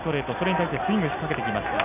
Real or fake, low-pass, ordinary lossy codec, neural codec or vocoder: real; 3.6 kHz; none; none